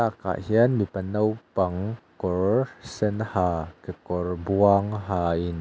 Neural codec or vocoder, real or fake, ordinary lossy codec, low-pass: none; real; none; none